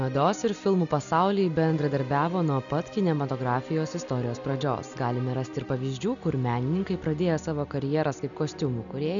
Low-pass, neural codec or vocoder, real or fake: 7.2 kHz; none; real